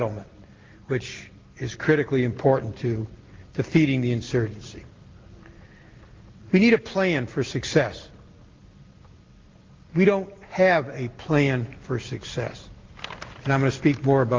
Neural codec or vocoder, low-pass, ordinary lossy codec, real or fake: none; 7.2 kHz; Opus, 32 kbps; real